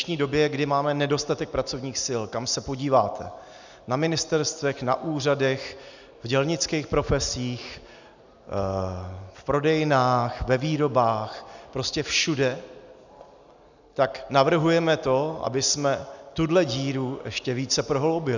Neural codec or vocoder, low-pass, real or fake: none; 7.2 kHz; real